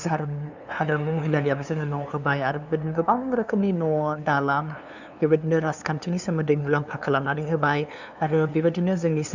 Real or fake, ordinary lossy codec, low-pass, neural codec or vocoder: fake; none; 7.2 kHz; codec, 16 kHz, 2 kbps, FunCodec, trained on LibriTTS, 25 frames a second